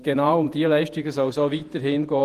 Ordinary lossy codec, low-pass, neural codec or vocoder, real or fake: Opus, 24 kbps; 14.4 kHz; vocoder, 48 kHz, 128 mel bands, Vocos; fake